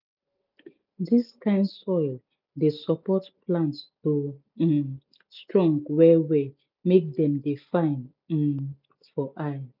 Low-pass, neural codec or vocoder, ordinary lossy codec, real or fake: 5.4 kHz; none; none; real